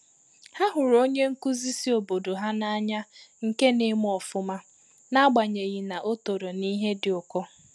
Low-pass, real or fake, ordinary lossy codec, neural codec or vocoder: none; real; none; none